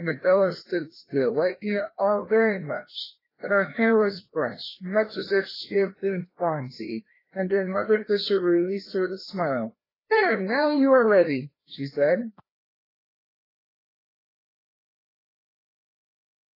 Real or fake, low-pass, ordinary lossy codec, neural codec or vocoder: fake; 5.4 kHz; AAC, 24 kbps; codec, 16 kHz, 1 kbps, FreqCodec, larger model